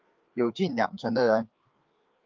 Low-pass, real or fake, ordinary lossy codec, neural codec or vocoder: 7.2 kHz; fake; Opus, 32 kbps; codec, 16 kHz, 4 kbps, FreqCodec, larger model